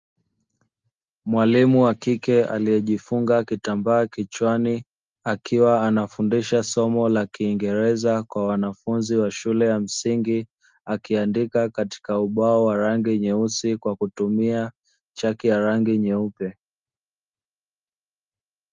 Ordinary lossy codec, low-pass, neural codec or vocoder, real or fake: Opus, 32 kbps; 7.2 kHz; none; real